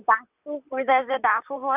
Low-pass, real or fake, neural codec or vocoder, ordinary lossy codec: 3.6 kHz; fake; vocoder, 44.1 kHz, 128 mel bands, Pupu-Vocoder; none